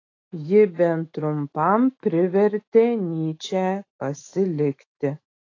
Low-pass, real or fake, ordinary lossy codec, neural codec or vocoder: 7.2 kHz; fake; AAC, 32 kbps; autoencoder, 48 kHz, 128 numbers a frame, DAC-VAE, trained on Japanese speech